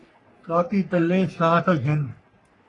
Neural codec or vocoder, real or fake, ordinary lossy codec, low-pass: codec, 44.1 kHz, 3.4 kbps, Pupu-Codec; fake; AAC, 32 kbps; 10.8 kHz